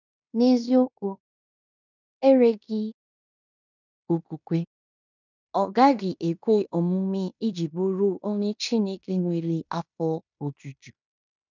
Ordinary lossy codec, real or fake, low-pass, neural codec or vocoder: none; fake; 7.2 kHz; codec, 16 kHz in and 24 kHz out, 0.9 kbps, LongCat-Audio-Codec, fine tuned four codebook decoder